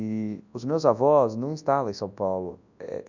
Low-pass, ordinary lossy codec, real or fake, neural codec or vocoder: 7.2 kHz; none; fake; codec, 24 kHz, 0.9 kbps, WavTokenizer, large speech release